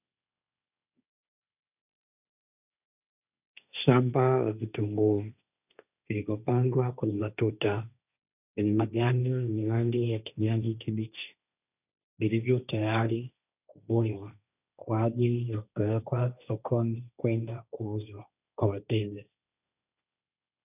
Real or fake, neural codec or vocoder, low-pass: fake; codec, 16 kHz, 1.1 kbps, Voila-Tokenizer; 3.6 kHz